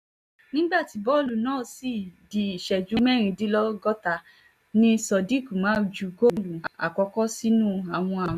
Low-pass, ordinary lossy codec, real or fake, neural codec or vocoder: 14.4 kHz; none; real; none